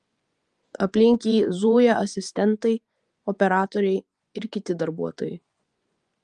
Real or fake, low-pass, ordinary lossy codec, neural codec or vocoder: fake; 10.8 kHz; Opus, 32 kbps; vocoder, 24 kHz, 100 mel bands, Vocos